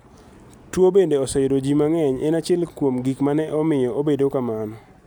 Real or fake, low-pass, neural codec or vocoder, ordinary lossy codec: real; none; none; none